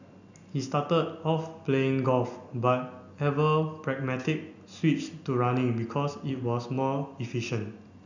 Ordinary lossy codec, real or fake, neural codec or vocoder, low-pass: none; real; none; 7.2 kHz